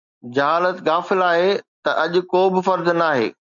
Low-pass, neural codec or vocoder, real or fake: 7.2 kHz; none; real